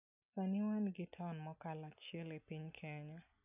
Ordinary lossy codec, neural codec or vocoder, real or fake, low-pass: none; none; real; 3.6 kHz